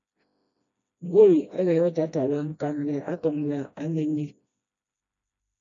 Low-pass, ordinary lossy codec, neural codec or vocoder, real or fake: 7.2 kHz; AAC, 64 kbps; codec, 16 kHz, 1 kbps, FreqCodec, smaller model; fake